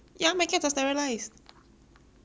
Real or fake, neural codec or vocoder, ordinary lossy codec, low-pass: real; none; none; none